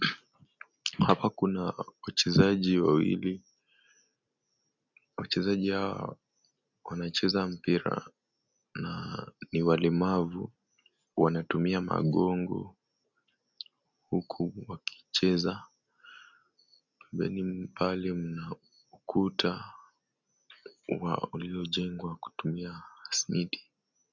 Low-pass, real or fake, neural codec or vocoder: 7.2 kHz; real; none